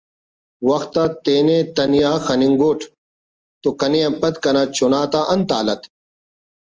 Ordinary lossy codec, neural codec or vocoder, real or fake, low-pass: Opus, 24 kbps; none; real; 7.2 kHz